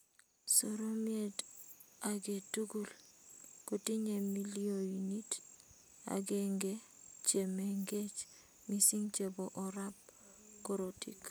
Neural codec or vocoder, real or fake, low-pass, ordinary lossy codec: none; real; none; none